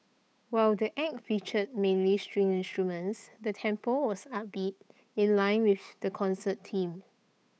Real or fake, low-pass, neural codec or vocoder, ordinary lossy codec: fake; none; codec, 16 kHz, 8 kbps, FunCodec, trained on Chinese and English, 25 frames a second; none